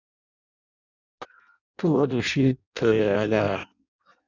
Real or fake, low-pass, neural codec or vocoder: fake; 7.2 kHz; codec, 16 kHz in and 24 kHz out, 0.6 kbps, FireRedTTS-2 codec